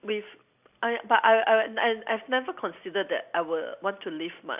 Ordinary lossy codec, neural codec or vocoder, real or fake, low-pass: AAC, 32 kbps; none; real; 3.6 kHz